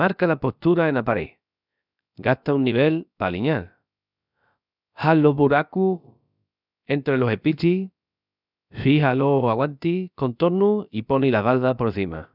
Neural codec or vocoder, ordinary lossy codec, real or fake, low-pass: codec, 16 kHz, about 1 kbps, DyCAST, with the encoder's durations; none; fake; 5.4 kHz